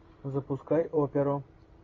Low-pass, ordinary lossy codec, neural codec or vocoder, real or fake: 7.2 kHz; AAC, 32 kbps; vocoder, 44.1 kHz, 128 mel bands every 256 samples, BigVGAN v2; fake